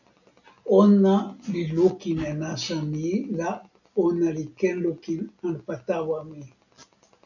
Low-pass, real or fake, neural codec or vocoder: 7.2 kHz; real; none